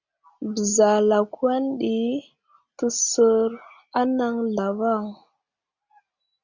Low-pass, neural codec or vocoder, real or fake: 7.2 kHz; none; real